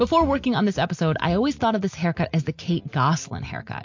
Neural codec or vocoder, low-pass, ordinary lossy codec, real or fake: none; 7.2 kHz; MP3, 48 kbps; real